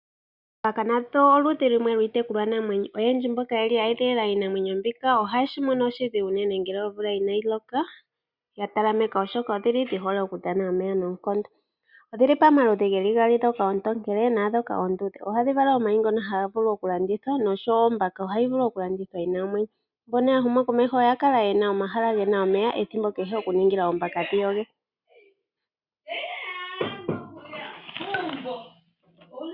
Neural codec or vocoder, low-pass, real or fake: none; 5.4 kHz; real